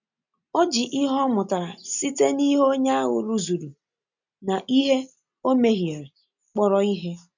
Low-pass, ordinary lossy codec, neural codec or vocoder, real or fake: 7.2 kHz; none; none; real